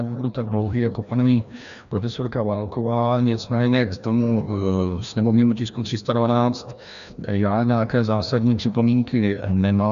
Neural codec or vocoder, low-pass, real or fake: codec, 16 kHz, 1 kbps, FreqCodec, larger model; 7.2 kHz; fake